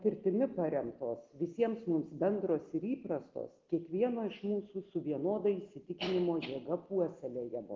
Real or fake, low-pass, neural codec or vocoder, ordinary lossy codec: real; 7.2 kHz; none; Opus, 16 kbps